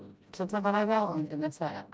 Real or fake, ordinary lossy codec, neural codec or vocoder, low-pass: fake; none; codec, 16 kHz, 0.5 kbps, FreqCodec, smaller model; none